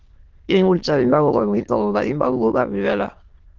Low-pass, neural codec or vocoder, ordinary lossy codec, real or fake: 7.2 kHz; autoencoder, 22.05 kHz, a latent of 192 numbers a frame, VITS, trained on many speakers; Opus, 16 kbps; fake